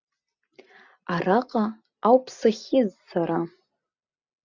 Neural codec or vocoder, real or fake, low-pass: none; real; 7.2 kHz